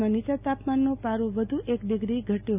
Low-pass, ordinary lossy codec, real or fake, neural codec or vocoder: 3.6 kHz; none; real; none